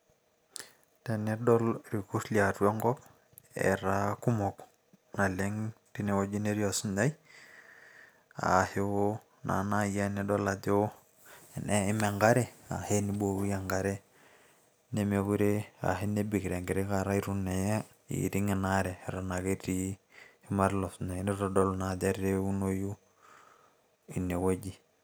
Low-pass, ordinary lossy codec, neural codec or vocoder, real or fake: none; none; none; real